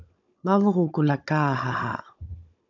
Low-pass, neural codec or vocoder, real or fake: 7.2 kHz; codec, 16 kHz, 16 kbps, FunCodec, trained on Chinese and English, 50 frames a second; fake